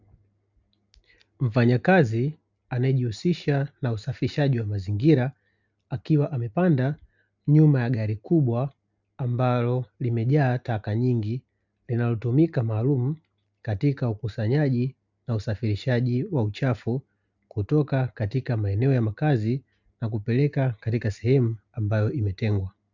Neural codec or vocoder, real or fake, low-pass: none; real; 7.2 kHz